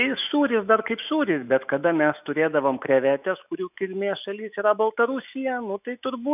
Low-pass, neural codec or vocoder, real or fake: 3.6 kHz; autoencoder, 48 kHz, 128 numbers a frame, DAC-VAE, trained on Japanese speech; fake